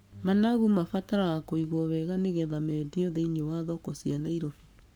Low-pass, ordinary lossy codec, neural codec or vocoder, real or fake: none; none; codec, 44.1 kHz, 7.8 kbps, Pupu-Codec; fake